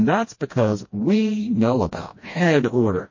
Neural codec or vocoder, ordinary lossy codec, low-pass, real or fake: codec, 16 kHz, 1 kbps, FreqCodec, smaller model; MP3, 32 kbps; 7.2 kHz; fake